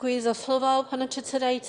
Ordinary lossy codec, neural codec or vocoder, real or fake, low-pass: Opus, 64 kbps; autoencoder, 22.05 kHz, a latent of 192 numbers a frame, VITS, trained on one speaker; fake; 9.9 kHz